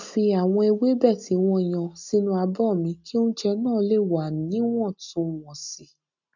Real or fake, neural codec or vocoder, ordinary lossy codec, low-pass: real; none; none; 7.2 kHz